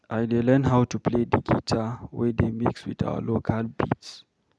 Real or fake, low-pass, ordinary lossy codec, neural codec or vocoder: real; none; none; none